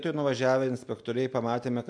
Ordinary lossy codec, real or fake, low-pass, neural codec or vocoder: MP3, 64 kbps; real; 9.9 kHz; none